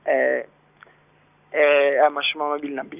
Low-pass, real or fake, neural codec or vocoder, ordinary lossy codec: 3.6 kHz; real; none; none